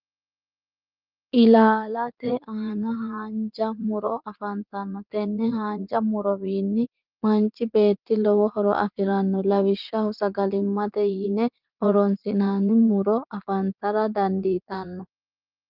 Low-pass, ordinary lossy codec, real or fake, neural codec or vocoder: 5.4 kHz; Opus, 24 kbps; fake; vocoder, 44.1 kHz, 80 mel bands, Vocos